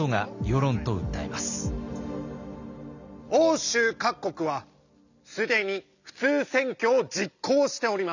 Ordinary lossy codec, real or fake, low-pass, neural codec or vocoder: none; real; 7.2 kHz; none